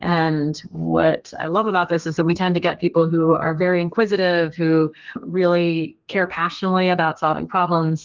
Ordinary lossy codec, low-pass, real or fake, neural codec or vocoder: Opus, 32 kbps; 7.2 kHz; fake; codec, 32 kHz, 1.9 kbps, SNAC